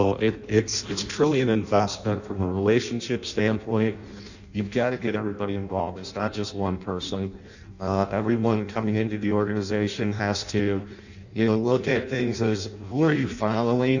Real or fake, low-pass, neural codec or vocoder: fake; 7.2 kHz; codec, 16 kHz in and 24 kHz out, 0.6 kbps, FireRedTTS-2 codec